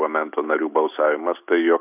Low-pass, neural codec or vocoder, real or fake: 3.6 kHz; none; real